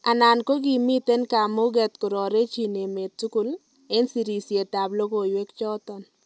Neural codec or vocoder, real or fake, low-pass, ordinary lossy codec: none; real; none; none